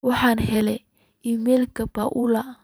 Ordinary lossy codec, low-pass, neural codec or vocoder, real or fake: none; none; vocoder, 44.1 kHz, 128 mel bands every 512 samples, BigVGAN v2; fake